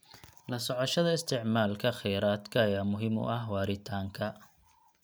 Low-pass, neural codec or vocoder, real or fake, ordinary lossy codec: none; none; real; none